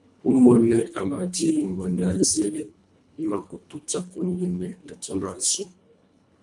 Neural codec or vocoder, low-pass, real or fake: codec, 24 kHz, 1.5 kbps, HILCodec; 10.8 kHz; fake